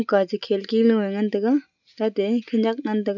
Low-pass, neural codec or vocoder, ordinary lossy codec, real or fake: 7.2 kHz; none; none; real